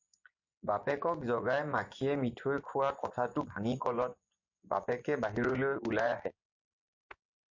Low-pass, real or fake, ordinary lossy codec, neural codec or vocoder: 7.2 kHz; fake; MP3, 48 kbps; vocoder, 24 kHz, 100 mel bands, Vocos